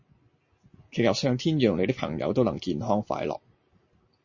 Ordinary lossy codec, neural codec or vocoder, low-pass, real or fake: MP3, 32 kbps; none; 7.2 kHz; real